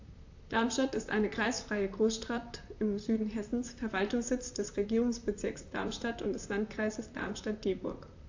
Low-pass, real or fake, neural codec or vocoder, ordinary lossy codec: 7.2 kHz; fake; vocoder, 44.1 kHz, 128 mel bands, Pupu-Vocoder; AAC, 48 kbps